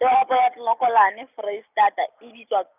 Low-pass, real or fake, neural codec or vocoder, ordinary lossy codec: 3.6 kHz; real; none; none